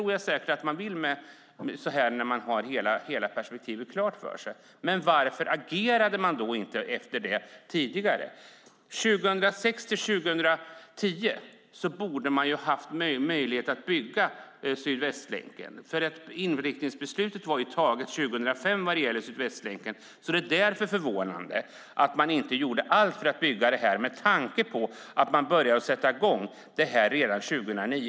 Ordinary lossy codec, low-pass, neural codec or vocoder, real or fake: none; none; none; real